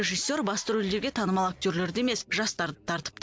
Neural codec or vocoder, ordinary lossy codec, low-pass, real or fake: none; none; none; real